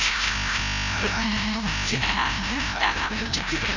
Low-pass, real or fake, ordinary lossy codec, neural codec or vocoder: 7.2 kHz; fake; none; codec, 16 kHz, 0.5 kbps, FreqCodec, larger model